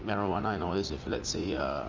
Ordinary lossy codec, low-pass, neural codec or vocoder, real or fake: Opus, 32 kbps; 7.2 kHz; vocoder, 44.1 kHz, 80 mel bands, Vocos; fake